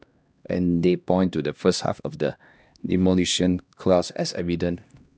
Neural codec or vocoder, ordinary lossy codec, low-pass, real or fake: codec, 16 kHz, 1 kbps, X-Codec, HuBERT features, trained on LibriSpeech; none; none; fake